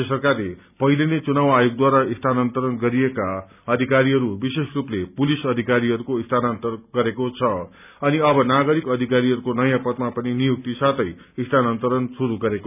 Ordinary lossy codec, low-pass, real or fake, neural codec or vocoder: none; 3.6 kHz; real; none